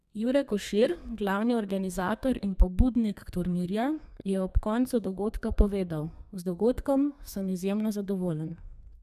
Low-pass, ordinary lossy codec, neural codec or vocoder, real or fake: 14.4 kHz; none; codec, 32 kHz, 1.9 kbps, SNAC; fake